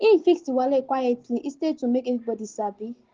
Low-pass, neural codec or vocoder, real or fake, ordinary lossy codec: 7.2 kHz; none; real; Opus, 16 kbps